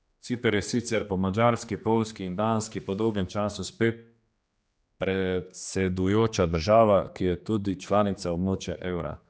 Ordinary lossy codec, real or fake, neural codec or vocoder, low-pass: none; fake; codec, 16 kHz, 2 kbps, X-Codec, HuBERT features, trained on general audio; none